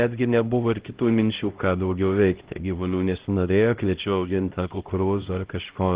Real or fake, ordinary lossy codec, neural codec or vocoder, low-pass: fake; Opus, 16 kbps; codec, 16 kHz, 0.5 kbps, X-Codec, HuBERT features, trained on LibriSpeech; 3.6 kHz